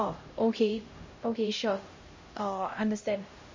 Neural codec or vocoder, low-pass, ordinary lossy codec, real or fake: codec, 16 kHz, 0.5 kbps, X-Codec, HuBERT features, trained on LibriSpeech; 7.2 kHz; MP3, 32 kbps; fake